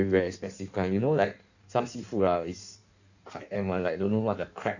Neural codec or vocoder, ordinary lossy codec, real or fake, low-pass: codec, 16 kHz in and 24 kHz out, 1.1 kbps, FireRedTTS-2 codec; none; fake; 7.2 kHz